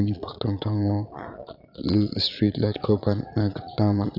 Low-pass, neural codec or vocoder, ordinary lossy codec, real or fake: 5.4 kHz; vocoder, 22.05 kHz, 80 mel bands, Vocos; none; fake